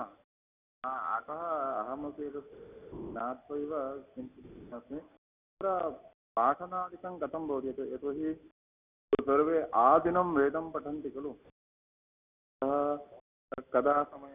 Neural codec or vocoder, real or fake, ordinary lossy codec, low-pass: none; real; none; 3.6 kHz